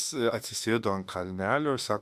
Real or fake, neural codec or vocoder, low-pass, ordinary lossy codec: fake; autoencoder, 48 kHz, 32 numbers a frame, DAC-VAE, trained on Japanese speech; 14.4 kHz; Opus, 64 kbps